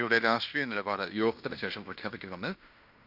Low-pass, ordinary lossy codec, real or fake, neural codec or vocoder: 5.4 kHz; none; fake; codec, 16 kHz in and 24 kHz out, 0.9 kbps, LongCat-Audio-Codec, fine tuned four codebook decoder